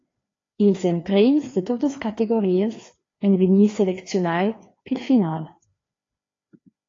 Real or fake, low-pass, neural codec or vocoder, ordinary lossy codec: fake; 7.2 kHz; codec, 16 kHz, 2 kbps, FreqCodec, larger model; AAC, 32 kbps